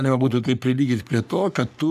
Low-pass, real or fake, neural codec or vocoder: 14.4 kHz; fake; codec, 44.1 kHz, 3.4 kbps, Pupu-Codec